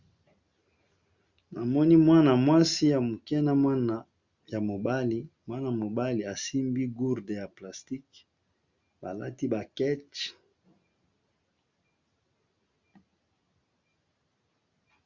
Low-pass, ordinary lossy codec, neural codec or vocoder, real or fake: 7.2 kHz; Opus, 64 kbps; none; real